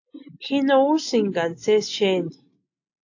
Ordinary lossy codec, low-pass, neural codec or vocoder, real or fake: AAC, 48 kbps; 7.2 kHz; none; real